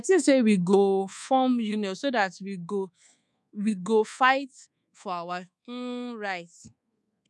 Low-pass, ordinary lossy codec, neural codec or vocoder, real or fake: none; none; codec, 24 kHz, 1.2 kbps, DualCodec; fake